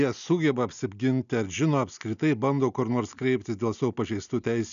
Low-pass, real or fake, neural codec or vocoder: 7.2 kHz; real; none